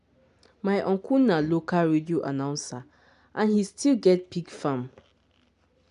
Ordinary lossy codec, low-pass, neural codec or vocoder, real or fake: none; 10.8 kHz; none; real